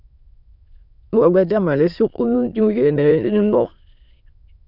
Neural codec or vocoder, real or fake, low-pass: autoencoder, 22.05 kHz, a latent of 192 numbers a frame, VITS, trained on many speakers; fake; 5.4 kHz